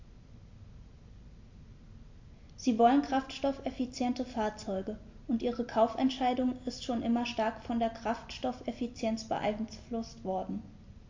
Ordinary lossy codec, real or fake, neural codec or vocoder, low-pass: MP3, 48 kbps; real; none; 7.2 kHz